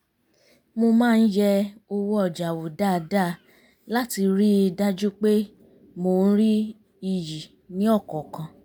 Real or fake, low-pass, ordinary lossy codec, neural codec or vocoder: real; none; none; none